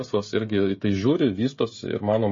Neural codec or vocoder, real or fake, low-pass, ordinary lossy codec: codec, 16 kHz, 16 kbps, FreqCodec, smaller model; fake; 7.2 kHz; MP3, 32 kbps